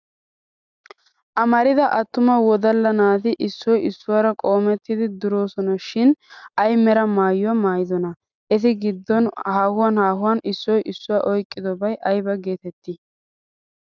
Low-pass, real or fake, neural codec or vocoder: 7.2 kHz; real; none